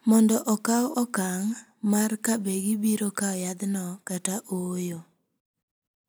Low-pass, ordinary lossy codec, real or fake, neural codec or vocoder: none; none; real; none